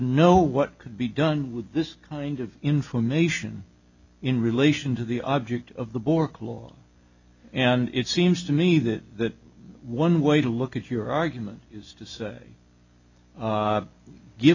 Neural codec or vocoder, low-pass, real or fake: none; 7.2 kHz; real